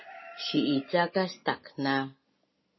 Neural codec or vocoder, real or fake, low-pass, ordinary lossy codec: none; real; 7.2 kHz; MP3, 24 kbps